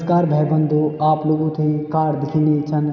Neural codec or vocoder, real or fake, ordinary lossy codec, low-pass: none; real; none; 7.2 kHz